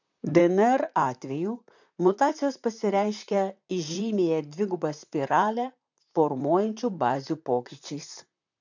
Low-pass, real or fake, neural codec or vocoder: 7.2 kHz; fake; vocoder, 44.1 kHz, 128 mel bands, Pupu-Vocoder